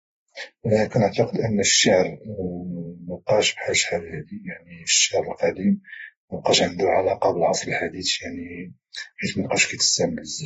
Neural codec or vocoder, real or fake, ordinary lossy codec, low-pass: vocoder, 48 kHz, 128 mel bands, Vocos; fake; AAC, 24 kbps; 19.8 kHz